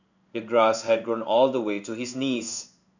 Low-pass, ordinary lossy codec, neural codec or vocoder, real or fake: 7.2 kHz; none; codec, 16 kHz in and 24 kHz out, 1 kbps, XY-Tokenizer; fake